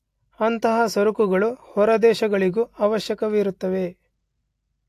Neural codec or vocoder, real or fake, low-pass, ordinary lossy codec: none; real; 14.4 kHz; AAC, 64 kbps